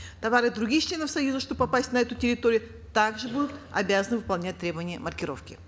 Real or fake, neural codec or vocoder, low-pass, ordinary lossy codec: real; none; none; none